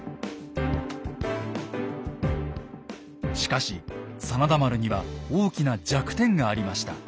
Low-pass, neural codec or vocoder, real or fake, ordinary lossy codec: none; none; real; none